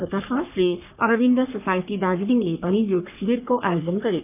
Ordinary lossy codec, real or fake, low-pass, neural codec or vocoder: none; fake; 3.6 kHz; codec, 44.1 kHz, 3.4 kbps, Pupu-Codec